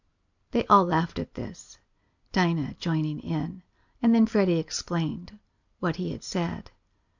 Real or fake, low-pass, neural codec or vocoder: real; 7.2 kHz; none